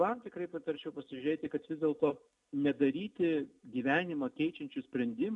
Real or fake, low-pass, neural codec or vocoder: real; 10.8 kHz; none